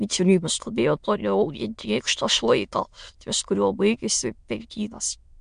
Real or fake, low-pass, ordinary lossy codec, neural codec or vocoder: fake; 9.9 kHz; MP3, 64 kbps; autoencoder, 22.05 kHz, a latent of 192 numbers a frame, VITS, trained on many speakers